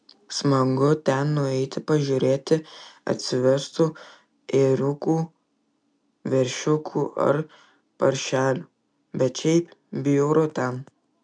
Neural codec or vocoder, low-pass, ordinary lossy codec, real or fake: none; 9.9 kHz; MP3, 96 kbps; real